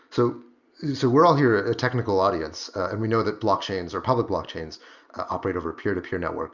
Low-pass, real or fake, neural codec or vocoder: 7.2 kHz; real; none